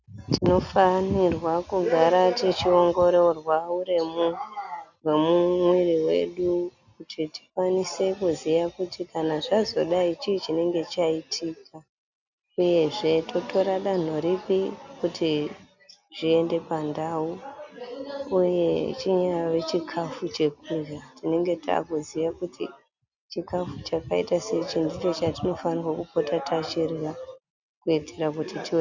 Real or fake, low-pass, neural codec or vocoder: real; 7.2 kHz; none